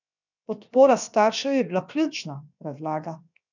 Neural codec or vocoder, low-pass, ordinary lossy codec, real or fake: codec, 16 kHz, 0.7 kbps, FocalCodec; 7.2 kHz; none; fake